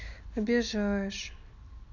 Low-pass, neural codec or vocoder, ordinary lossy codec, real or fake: 7.2 kHz; none; none; real